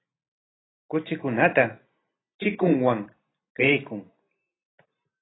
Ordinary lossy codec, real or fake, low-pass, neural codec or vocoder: AAC, 16 kbps; real; 7.2 kHz; none